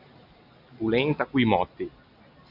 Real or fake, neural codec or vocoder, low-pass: real; none; 5.4 kHz